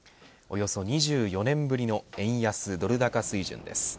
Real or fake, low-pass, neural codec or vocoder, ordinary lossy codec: real; none; none; none